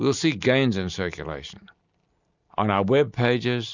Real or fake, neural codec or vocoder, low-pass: real; none; 7.2 kHz